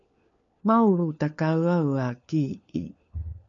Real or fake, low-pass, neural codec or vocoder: fake; 7.2 kHz; codec, 16 kHz, 4 kbps, FunCodec, trained on LibriTTS, 50 frames a second